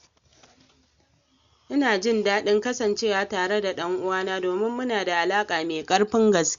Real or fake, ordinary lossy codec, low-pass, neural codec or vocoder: real; Opus, 64 kbps; 7.2 kHz; none